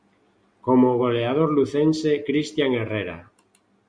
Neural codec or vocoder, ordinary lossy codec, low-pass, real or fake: none; Opus, 64 kbps; 9.9 kHz; real